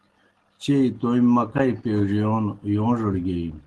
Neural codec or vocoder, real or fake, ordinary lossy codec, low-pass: none; real; Opus, 16 kbps; 10.8 kHz